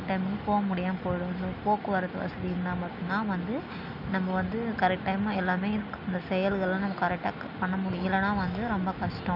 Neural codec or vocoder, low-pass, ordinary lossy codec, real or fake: none; 5.4 kHz; none; real